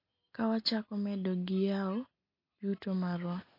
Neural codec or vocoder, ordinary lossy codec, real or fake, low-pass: none; MP3, 32 kbps; real; 5.4 kHz